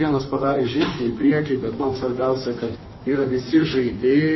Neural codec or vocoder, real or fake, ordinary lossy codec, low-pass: codec, 16 kHz in and 24 kHz out, 1.1 kbps, FireRedTTS-2 codec; fake; MP3, 24 kbps; 7.2 kHz